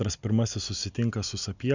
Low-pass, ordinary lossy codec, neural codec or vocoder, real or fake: 7.2 kHz; Opus, 64 kbps; none; real